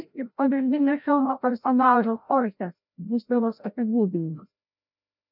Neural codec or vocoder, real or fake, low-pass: codec, 16 kHz, 0.5 kbps, FreqCodec, larger model; fake; 5.4 kHz